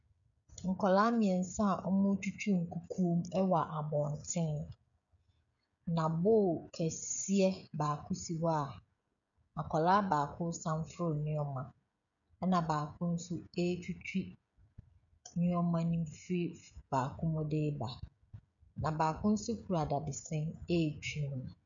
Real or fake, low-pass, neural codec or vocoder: fake; 7.2 kHz; codec, 16 kHz, 16 kbps, FreqCodec, smaller model